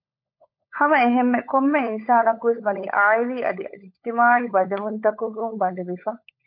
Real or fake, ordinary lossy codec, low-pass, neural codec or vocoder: fake; MP3, 32 kbps; 5.4 kHz; codec, 16 kHz, 16 kbps, FunCodec, trained on LibriTTS, 50 frames a second